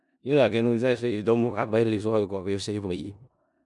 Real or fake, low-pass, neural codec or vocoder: fake; 10.8 kHz; codec, 16 kHz in and 24 kHz out, 0.4 kbps, LongCat-Audio-Codec, four codebook decoder